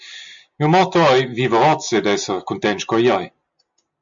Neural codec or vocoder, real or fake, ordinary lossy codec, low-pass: none; real; MP3, 64 kbps; 7.2 kHz